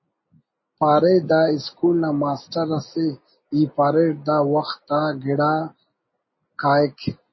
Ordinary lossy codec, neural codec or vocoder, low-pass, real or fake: MP3, 24 kbps; none; 7.2 kHz; real